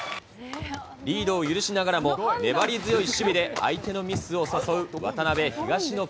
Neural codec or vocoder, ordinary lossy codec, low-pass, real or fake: none; none; none; real